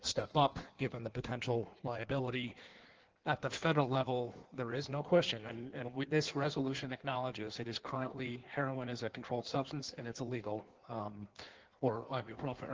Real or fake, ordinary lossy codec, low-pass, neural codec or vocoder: fake; Opus, 16 kbps; 7.2 kHz; codec, 16 kHz in and 24 kHz out, 1.1 kbps, FireRedTTS-2 codec